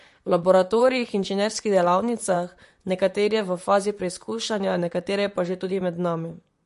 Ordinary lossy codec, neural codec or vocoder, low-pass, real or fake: MP3, 48 kbps; vocoder, 44.1 kHz, 128 mel bands, Pupu-Vocoder; 14.4 kHz; fake